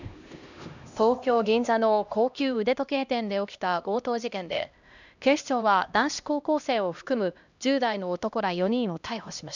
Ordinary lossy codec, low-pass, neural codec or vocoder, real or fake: none; 7.2 kHz; codec, 16 kHz, 1 kbps, X-Codec, HuBERT features, trained on LibriSpeech; fake